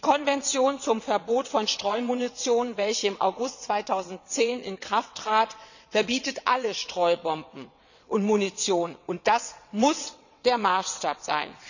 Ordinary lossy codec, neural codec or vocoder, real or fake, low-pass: none; vocoder, 22.05 kHz, 80 mel bands, WaveNeXt; fake; 7.2 kHz